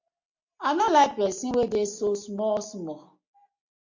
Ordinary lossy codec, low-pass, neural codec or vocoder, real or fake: MP3, 48 kbps; 7.2 kHz; none; real